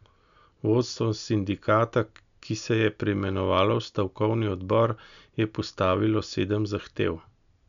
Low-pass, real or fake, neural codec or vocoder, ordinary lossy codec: 7.2 kHz; real; none; none